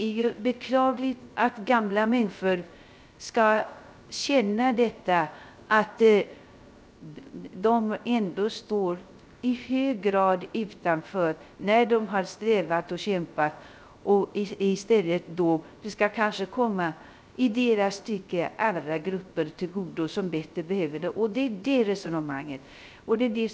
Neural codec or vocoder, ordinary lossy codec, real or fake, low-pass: codec, 16 kHz, 0.3 kbps, FocalCodec; none; fake; none